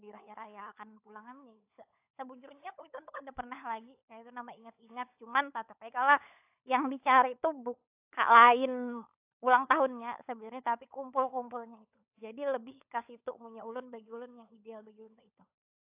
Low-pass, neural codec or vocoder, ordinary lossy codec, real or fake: 3.6 kHz; codec, 16 kHz, 16 kbps, FunCodec, trained on LibriTTS, 50 frames a second; AAC, 32 kbps; fake